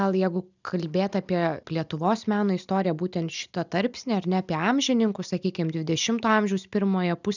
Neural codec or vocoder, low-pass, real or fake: none; 7.2 kHz; real